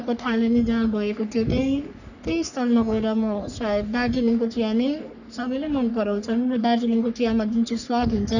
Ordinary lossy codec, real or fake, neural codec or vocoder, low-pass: none; fake; codec, 44.1 kHz, 3.4 kbps, Pupu-Codec; 7.2 kHz